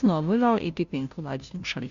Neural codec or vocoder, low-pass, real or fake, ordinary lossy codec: codec, 16 kHz, 0.5 kbps, FunCodec, trained on Chinese and English, 25 frames a second; 7.2 kHz; fake; Opus, 64 kbps